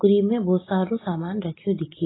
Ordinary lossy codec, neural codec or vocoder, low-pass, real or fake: AAC, 16 kbps; none; 7.2 kHz; real